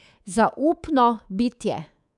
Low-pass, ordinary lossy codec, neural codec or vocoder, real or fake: 10.8 kHz; none; codec, 24 kHz, 3.1 kbps, DualCodec; fake